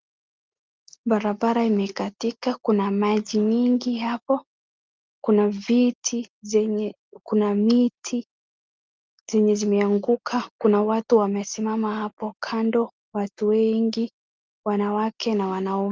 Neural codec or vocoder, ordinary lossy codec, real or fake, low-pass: none; Opus, 32 kbps; real; 7.2 kHz